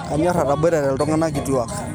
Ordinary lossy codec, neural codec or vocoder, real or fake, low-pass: none; none; real; none